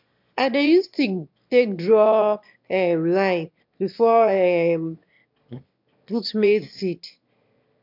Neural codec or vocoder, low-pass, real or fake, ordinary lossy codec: autoencoder, 22.05 kHz, a latent of 192 numbers a frame, VITS, trained on one speaker; 5.4 kHz; fake; MP3, 48 kbps